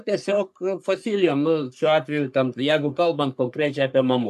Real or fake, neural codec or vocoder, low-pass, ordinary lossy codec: fake; codec, 44.1 kHz, 3.4 kbps, Pupu-Codec; 14.4 kHz; AAC, 96 kbps